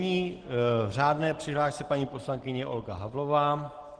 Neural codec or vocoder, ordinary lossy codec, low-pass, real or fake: none; Opus, 16 kbps; 9.9 kHz; real